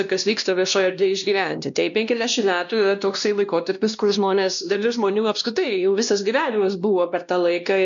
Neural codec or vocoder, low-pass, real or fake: codec, 16 kHz, 1 kbps, X-Codec, WavLM features, trained on Multilingual LibriSpeech; 7.2 kHz; fake